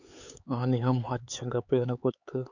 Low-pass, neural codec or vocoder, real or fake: 7.2 kHz; codec, 16 kHz, 4 kbps, X-Codec, HuBERT features, trained on LibriSpeech; fake